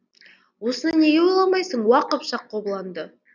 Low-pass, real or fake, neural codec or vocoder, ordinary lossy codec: 7.2 kHz; real; none; none